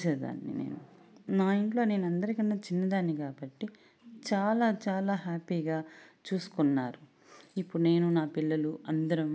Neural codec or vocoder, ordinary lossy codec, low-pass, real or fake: none; none; none; real